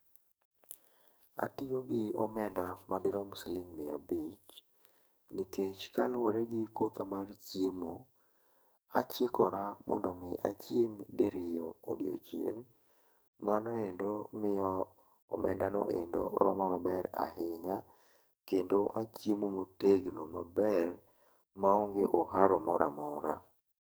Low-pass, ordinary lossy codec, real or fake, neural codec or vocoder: none; none; fake; codec, 44.1 kHz, 2.6 kbps, SNAC